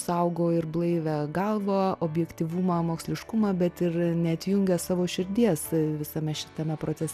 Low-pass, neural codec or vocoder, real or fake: 14.4 kHz; none; real